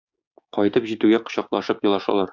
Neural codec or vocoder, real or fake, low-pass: codec, 16 kHz, 6 kbps, DAC; fake; 7.2 kHz